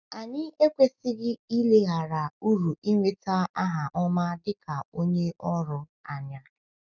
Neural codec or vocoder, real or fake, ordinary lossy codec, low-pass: none; real; none; 7.2 kHz